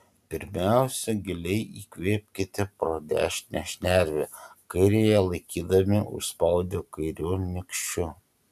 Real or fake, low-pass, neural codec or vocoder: real; 14.4 kHz; none